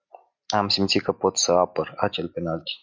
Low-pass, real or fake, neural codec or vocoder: 7.2 kHz; real; none